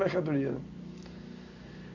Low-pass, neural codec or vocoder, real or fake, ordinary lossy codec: 7.2 kHz; none; real; none